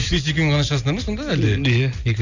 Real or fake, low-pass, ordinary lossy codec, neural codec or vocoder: real; 7.2 kHz; none; none